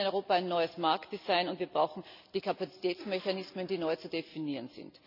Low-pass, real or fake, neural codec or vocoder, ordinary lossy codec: 5.4 kHz; real; none; none